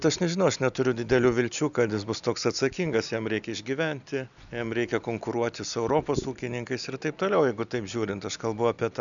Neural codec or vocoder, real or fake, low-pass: none; real; 7.2 kHz